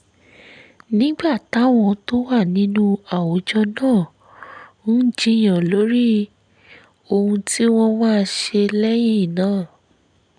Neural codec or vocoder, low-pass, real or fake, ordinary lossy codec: none; 9.9 kHz; real; none